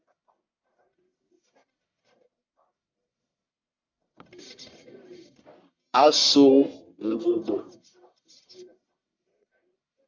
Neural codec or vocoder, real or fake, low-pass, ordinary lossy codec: codec, 44.1 kHz, 1.7 kbps, Pupu-Codec; fake; 7.2 kHz; MP3, 64 kbps